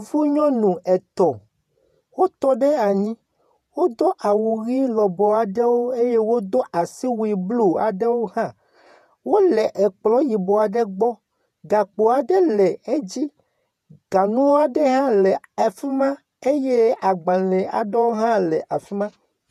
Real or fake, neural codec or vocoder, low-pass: fake; vocoder, 48 kHz, 128 mel bands, Vocos; 14.4 kHz